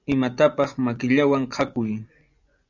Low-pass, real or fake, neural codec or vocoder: 7.2 kHz; fake; vocoder, 24 kHz, 100 mel bands, Vocos